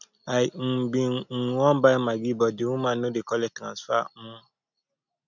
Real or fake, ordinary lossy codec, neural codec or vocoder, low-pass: real; none; none; 7.2 kHz